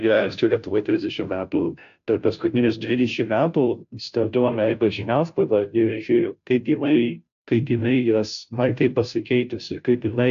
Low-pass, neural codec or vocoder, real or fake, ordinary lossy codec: 7.2 kHz; codec, 16 kHz, 0.5 kbps, FunCodec, trained on Chinese and English, 25 frames a second; fake; AAC, 64 kbps